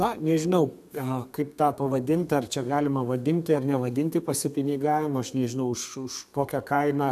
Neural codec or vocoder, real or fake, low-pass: codec, 32 kHz, 1.9 kbps, SNAC; fake; 14.4 kHz